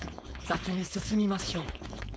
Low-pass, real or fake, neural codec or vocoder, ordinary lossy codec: none; fake; codec, 16 kHz, 4.8 kbps, FACodec; none